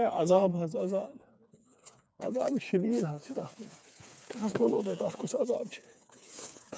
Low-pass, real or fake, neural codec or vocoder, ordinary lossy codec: none; fake; codec, 16 kHz, 4 kbps, FreqCodec, smaller model; none